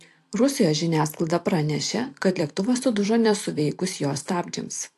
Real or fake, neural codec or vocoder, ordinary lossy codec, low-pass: fake; vocoder, 44.1 kHz, 128 mel bands every 256 samples, BigVGAN v2; AAC, 64 kbps; 14.4 kHz